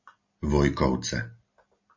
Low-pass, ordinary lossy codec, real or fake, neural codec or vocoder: 7.2 kHz; MP3, 48 kbps; real; none